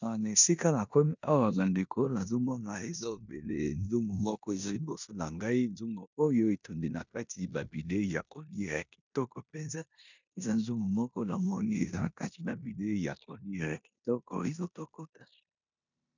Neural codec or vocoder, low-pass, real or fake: codec, 16 kHz in and 24 kHz out, 0.9 kbps, LongCat-Audio-Codec, four codebook decoder; 7.2 kHz; fake